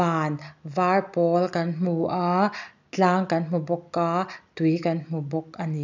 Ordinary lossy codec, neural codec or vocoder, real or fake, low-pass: none; none; real; 7.2 kHz